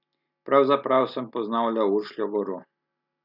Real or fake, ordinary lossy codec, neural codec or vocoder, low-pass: real; none; none; 5.4 kHz